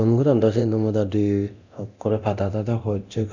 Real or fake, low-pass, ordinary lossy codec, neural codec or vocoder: fake; 7.2 kHz; none; codec, 24 kHz, 0.9 kbps, DualCodec